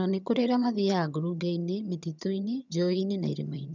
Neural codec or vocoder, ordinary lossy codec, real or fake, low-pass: vocoder, 22.05 kHz, 80 mel bands, HiFi-GAN; none; fake; 7.2 kHz